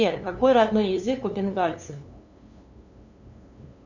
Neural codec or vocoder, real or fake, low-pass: codec, 16 kHz, 2 kbps, FunCodec, trained on LibriTTS, 25 frames a second; fake; 7.2 kHz